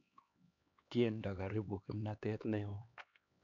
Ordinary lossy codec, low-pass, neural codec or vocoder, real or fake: none; 7.2 kHz; codec, 16 kHz, 2 kbps, X-Codec, HuBERT features, trained on LibriSpeech; fake